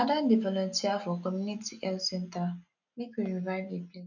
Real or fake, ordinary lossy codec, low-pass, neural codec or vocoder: real; none; 7.2 kHz; none